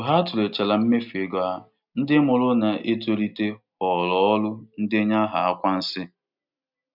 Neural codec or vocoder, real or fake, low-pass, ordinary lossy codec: none; real; 5.4 kHz; none